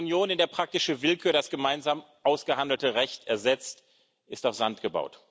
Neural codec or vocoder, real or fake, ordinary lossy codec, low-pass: none; real; none; none